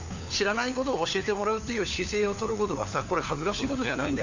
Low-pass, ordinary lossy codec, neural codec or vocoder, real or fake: 7.2 kHz; none; codec, 16 kHz, 4 kbps, FunCodec, trained on LibriTTS, 50 frames a second; fake